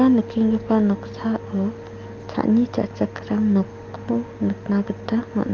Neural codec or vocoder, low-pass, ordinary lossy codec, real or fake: none; 7.2 kHz; Opus, 32 kbps; real